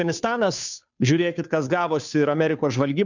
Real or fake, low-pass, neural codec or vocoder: fake; 7.2 kHz; codec, 16 kHz, 2 kbps, FunCodec, trained on Chinese and English, 25 frames a second